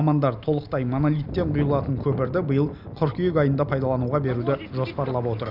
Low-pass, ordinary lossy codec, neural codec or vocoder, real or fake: 5.4 kHz; none; none; real